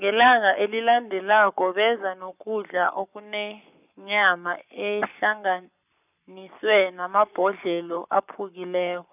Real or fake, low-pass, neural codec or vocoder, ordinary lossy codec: fake; 3.6 kHz; codec, 44.1 kHz, 7.8 kbps, Pupu-Codec; none